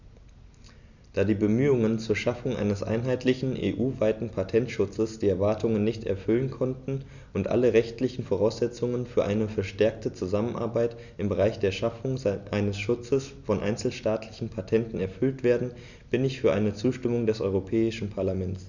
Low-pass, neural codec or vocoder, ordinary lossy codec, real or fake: 7.2 kHz; none; none; real